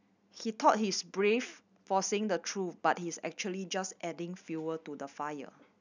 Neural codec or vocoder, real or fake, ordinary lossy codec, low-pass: none; real; none; 7.2 kHz